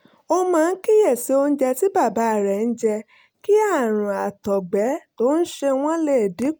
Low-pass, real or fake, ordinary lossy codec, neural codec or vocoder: none; real; none; none